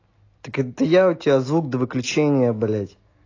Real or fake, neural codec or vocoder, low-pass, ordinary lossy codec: real; none; 7.2 kHz; AAC, 32 kbps